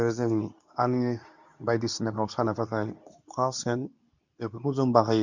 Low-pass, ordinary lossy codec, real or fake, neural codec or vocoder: 7.2 kHz; none; fake; codec, 24 kHz, 0.9 kbps, WavTokenizer, medium speech release version 2